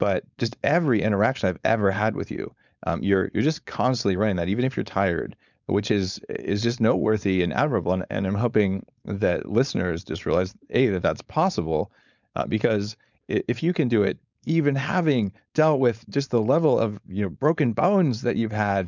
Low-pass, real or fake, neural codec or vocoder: 7.2 kHz; fake; codec, 16 kHz, 4.8 kbps, FACodec